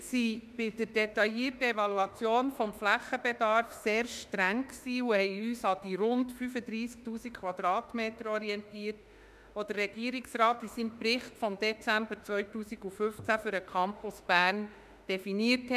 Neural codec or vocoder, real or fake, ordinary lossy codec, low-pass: autoencoder, 48 kHz, 32 numbers a frame, DAC-VAE, trained on Japanese speech; fake; none; 14.4 kHz